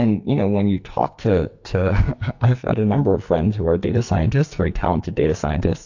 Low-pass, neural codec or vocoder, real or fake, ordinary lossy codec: 7.2 kHz; codec, 44.1 kHz, 2.6 kbps, SNAC; fake; AAC, 48 kbps